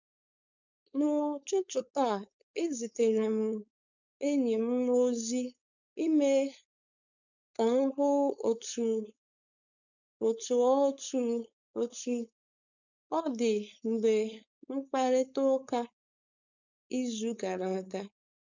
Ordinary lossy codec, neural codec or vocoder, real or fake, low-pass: none; codec, 16 kHz, 4.8 kbps, FACodec; fake; 7.2 kHz